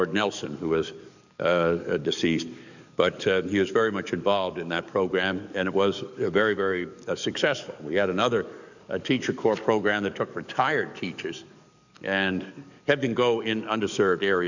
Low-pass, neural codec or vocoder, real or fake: 7.2 kHz; codec, 44.1 kHz, 7.8 kbps, DAC; fake